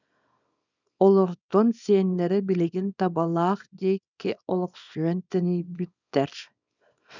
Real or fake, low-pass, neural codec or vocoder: fake; 7.2 kHz; codec, 24 kHz, 0.9 kbps, WavTokenizer, small release